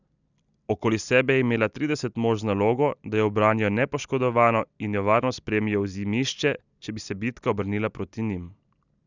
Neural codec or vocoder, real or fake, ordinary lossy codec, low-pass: none; real; none; 7.2 kHz